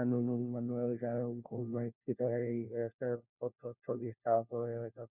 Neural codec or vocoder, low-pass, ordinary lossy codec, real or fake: codec, 16 kHz, 1 kbps, FunCodec, trained on LibriTTS, 50 frames a second; 3.6 kHz; none; fake